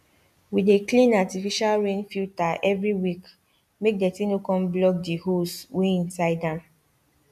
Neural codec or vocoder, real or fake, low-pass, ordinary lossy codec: none; real; 14.4 kHz; none